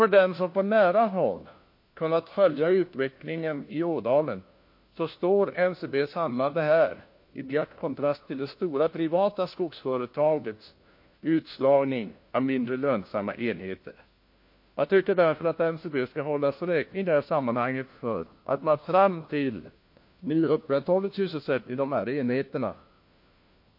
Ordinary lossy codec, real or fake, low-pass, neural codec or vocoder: MP3, 32 kbps; fake; 5.4 kHz; codec, 16 kHz, 1 kbps, FunCodec, trained on LibriTTS, 50 frames a second